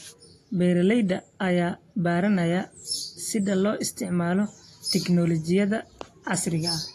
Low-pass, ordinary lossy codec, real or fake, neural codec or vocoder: 14.4 kHz; AAC, 48 kbps; real; none